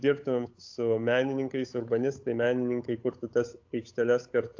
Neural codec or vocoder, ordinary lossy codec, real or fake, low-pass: codec, 16 kHz, 8 kbps, FunCodec, trained on Chinese and English, 25 frames a second; AAC, 48 kbps; fake; 7.2 kHz